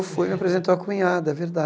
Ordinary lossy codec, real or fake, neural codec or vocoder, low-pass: none; real; none; none